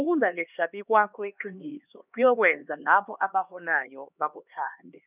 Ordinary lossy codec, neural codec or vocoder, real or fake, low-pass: none; codec, 16 kHz, 2 kbps, X-Codec, HuBERT features, trained on LibriSpeech; fake; 3.6 kHz